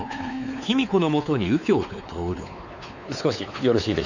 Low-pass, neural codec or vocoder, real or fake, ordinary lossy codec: 7.2 kHz; codec, 16 kHz, 4 kbps, X-Codec, WavLM features, trained on Multilingual LibriSpeech; fake; none